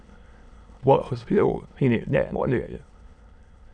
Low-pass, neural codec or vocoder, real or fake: 9.9 kHz; autoencoder, 22.05 kHz, a latent of 192 numbers a frame, VITS, trained on many speakers; fake